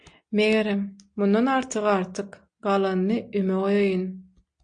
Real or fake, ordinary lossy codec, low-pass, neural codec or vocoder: real; AAC, 64 kbps; 9.9 kHz; none